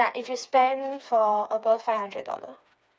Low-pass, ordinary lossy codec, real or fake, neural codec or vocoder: none; none; fake; codec, 16 kHz, 4 kbps, FreqCodec, smaller model